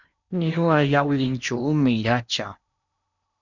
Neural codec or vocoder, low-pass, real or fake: codec, 16 kHz in and 24 kHz out, 0.8 kbps, FocalCodec, streaming, 65536 codes; 7.2 kHz; fake